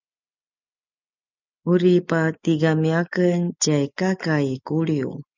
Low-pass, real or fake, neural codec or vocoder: 7.2 kHz; real; none